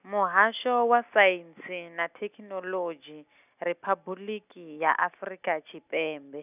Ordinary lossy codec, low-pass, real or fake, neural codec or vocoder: none; 3.6 kHz; real; none